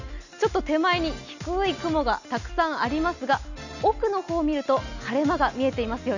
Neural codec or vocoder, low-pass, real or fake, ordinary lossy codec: none; 7.2 kHz; real; none